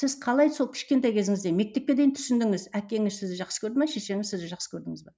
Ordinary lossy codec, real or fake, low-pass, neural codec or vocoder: none; real; none; none